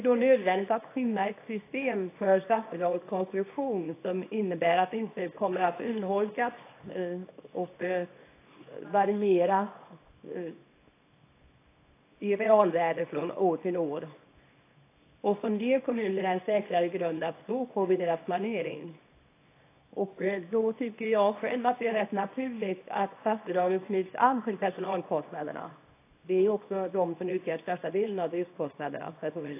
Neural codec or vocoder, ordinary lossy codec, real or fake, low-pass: codec, 24 kHz, 0.9 kbps, WavTokenizer, small release; AAC, 24 kbps; fake; 3.6 kHz